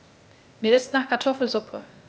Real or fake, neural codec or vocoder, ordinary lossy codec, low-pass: fake; codec, 16 kHz, 0.8 kbps, ZipCodec; none; none